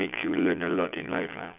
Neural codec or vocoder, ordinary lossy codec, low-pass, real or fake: vocoder, 22.05 kHz, 80 mel bands, Vocos; none; 3.6 kHz; fake